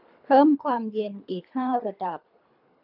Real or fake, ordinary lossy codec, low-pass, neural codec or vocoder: fake; none; 5.4 kHz; codec, 24 kHz, 3 kbps, HILCodec